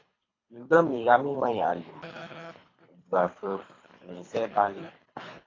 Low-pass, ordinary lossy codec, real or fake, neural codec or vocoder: 7.2 kHz; AAC, 32 kbps; fake; codec, 24 kHz, 3 kbps, HILCodec